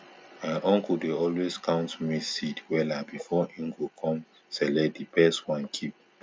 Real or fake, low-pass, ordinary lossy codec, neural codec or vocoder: real; none; none; none